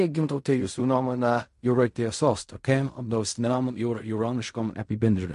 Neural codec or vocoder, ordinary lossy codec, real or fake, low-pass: codec, 16 kHz in and 24 kHz out, 0.4 kbps, LongCat-Audio-Codec, fine tuned four codebook decoder; MP3, 48 kbps; fake; 10.8 kHz